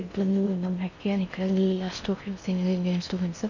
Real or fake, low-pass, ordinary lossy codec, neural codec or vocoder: fake; 7.2 kHz; none; codec, 16 kHz in and 24 kHz out, 0.6 kbps, FocalCodec, streaming, 4096 codes